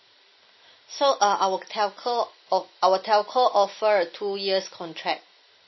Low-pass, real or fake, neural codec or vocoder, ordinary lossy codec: 7.2 kHz; real; none; MP3, 24 kbps